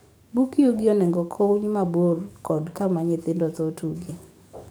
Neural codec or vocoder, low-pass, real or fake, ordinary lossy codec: codec, 44.1 kHz, 7.8 kbps, DAC; none; fake; none